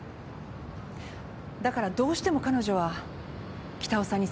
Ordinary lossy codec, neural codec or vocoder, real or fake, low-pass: none; none; real; none